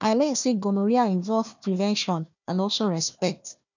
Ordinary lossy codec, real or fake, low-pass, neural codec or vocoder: none; fake; 7.2 kHz; codec, 16 kHz, 1 kbps, FunCodec, trained on Chinese and English, 50 frames a second